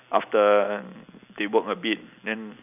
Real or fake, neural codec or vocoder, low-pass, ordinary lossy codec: real; none; 3.6 kHz; none